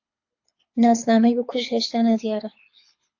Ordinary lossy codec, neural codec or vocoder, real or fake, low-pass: AAC, 48 kbps; codec, 24 kHz, 6 kbps, HILCodec; fake; 7.2 kHz